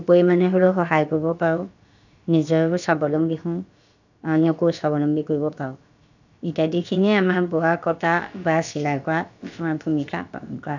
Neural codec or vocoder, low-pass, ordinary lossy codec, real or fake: codec, 16 kHz, about 1 kbps, DyCAST, with the encoder's durations; 7.2 kHz; none; fake